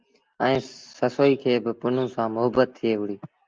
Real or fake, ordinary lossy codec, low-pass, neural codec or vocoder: real; Opus, 24 kbps; 7.2 kHz; none